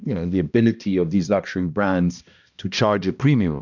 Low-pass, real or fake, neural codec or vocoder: 7.2 kHz; fake; codec, 16 kHz, 1 kbps, X-Codec, HuBERT features, trained on balanced general audio